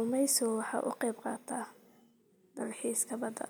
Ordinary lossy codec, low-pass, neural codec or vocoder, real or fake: none; none; none; real